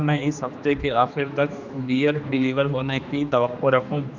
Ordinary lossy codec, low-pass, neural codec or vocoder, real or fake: none; 7.2 kHz; codec, 16 kHz, 2 kbps, X-Codec, HuBERT features, trained on general audio; fake